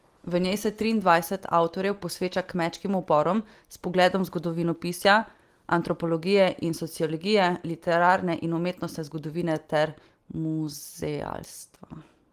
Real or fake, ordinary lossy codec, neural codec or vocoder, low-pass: real; Opus, 24 kbps; none; 14.4 kHz